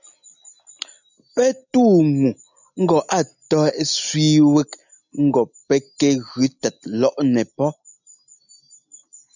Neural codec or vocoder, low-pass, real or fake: none; 7.2 kHz; real